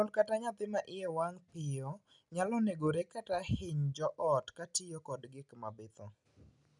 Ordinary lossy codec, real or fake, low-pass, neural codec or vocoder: none; real; 10.8 kHz; none